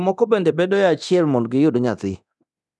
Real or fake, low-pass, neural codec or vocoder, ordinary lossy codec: fake; none; codec, 24 kHz, 0.9 kbps, DualCodec; none